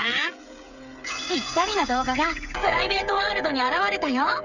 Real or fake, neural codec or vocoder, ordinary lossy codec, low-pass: fake; codec, 16 kHz, 8 kbps, FreqCodec, smaller model; none; 7.2 kHz